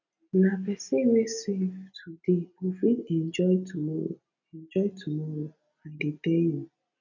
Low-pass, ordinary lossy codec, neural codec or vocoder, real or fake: 7.2 kHz; none; none; real